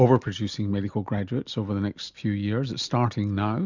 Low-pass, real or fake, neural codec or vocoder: 7.2 kHz; real; none